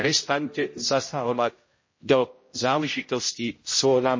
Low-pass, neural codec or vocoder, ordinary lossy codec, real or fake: 7.2 kHz; codec, 16 kHz, 0.5 kbps, X-Codec, HuBERT features, trained on general audio; MP3, 32 kbps; fake